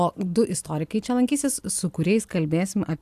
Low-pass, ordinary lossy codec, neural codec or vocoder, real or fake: 14.4 kHz; AAC, 96 kbps; none; real